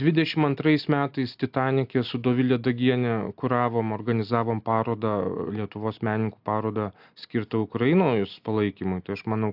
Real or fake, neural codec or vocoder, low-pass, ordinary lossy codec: real; none; 5.4 kHz; AAC, 48 kbps